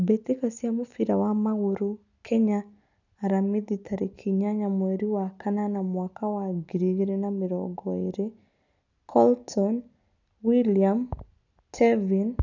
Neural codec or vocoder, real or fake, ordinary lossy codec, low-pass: none; real; none; 7.2 kHz